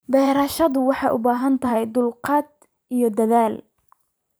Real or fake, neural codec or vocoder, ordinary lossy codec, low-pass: fake; vocoder, 44.1 kHz, 128 mel bands every 512 samples, BigVGAN v2; none; none